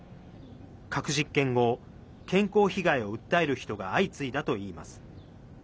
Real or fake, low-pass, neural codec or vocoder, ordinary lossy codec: real; none; none; none